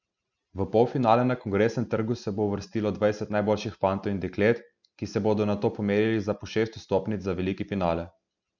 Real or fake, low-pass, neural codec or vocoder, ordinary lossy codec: real; 7.2 kHz; none; none